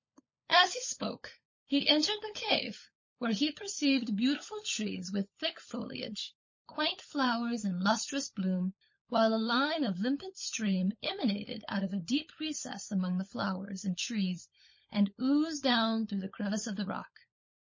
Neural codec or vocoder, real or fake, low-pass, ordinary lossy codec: codec, 16 kHz, 16 kbps, FunCodec, trained on LibriTTS, 50 frames a second; fake; 7.2 kHz; MP3, 32 kbps